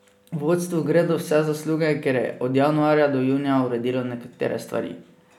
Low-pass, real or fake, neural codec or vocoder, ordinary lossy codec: 19.8 kHz; real; none; none